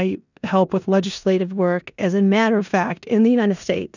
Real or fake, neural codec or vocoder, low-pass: fake; codec, 16 kHz in and 24 kHz out, 0.9 kbps, LongCat-Audio-Codec, four codebook decoder; 7.2 kHz